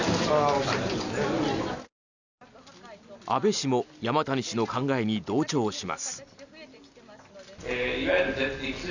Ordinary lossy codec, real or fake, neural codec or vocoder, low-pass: none; real; none; 7.2 kHz